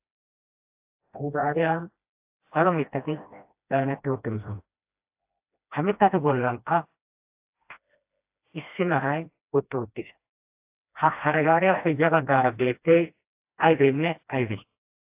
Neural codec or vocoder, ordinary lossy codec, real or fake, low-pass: codec, 16 kHz, 1 kbps, FreqCodec, smaller model; AAC, 32 kbps; fake; 3.6 kHz